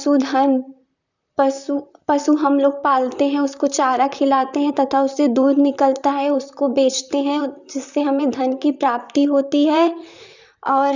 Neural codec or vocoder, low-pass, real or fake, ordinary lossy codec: vocoder, 44.1 kHz, 128 mel bands, Pupu-Vocoder; 7.2 kHz; fake; none